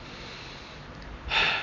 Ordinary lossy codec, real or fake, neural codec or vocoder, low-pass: MP3, 48 kbps; real; none; 7.2 kHz